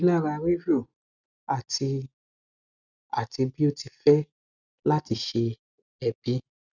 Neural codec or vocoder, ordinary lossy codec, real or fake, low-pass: none; none; real; 7.2 kHz